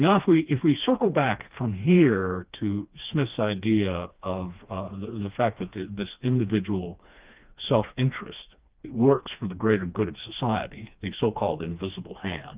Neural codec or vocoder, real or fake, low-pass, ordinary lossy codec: codec, 16 kHz, 2 kbps, FreqCodec, smaller model; fake; 3.6 kHz; Opus, 32 kbps